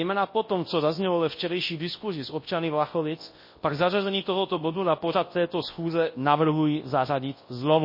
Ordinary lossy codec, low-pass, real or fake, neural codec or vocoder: MP3, 24 kbps; 5.4 kHz; fake; codec, 24 kHz, 0.9 kbps, WavTokenizer, large speech release